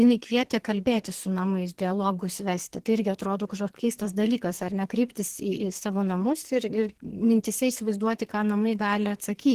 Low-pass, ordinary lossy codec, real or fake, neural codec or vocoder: 14.4 kHz; Opus, 16 kbps; fake; codec, 44.1 kHz, 2.6 kbps, SNAC